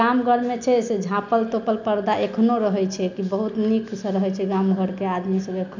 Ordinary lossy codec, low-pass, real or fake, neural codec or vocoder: none; 7.2 kHz; real; none